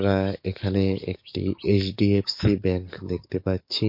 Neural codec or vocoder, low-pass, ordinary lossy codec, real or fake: codec, 44.1 kHz, 7.8 kbps, DAC; 5.4 kHz; MP3, 32 kbps; fake